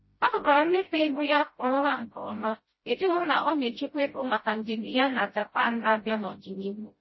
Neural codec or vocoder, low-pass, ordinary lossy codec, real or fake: codec, 16 kHz, 0.5 kbps, FreqCodec, smaller model; 7.2 kHz; MP3, 24 kbps; fake